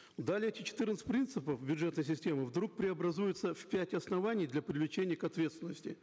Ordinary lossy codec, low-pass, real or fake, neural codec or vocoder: none; none; real; none